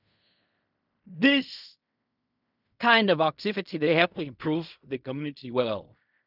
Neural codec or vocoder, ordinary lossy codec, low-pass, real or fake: codec, 16 kHz in and 24 kHz out, 0.4 kbps, LongCat-Audio-Codec, fine tuned four codebook decoder; none; 5.4 kHz; fake